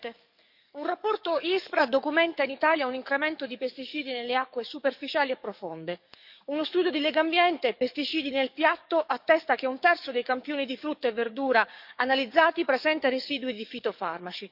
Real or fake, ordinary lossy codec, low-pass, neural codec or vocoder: fake; none; 5.4 kHz; codec, 44.1 kHz, 7.8 kbps, DAC